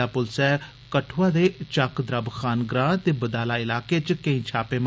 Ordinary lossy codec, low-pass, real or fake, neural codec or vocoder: none; none; real; none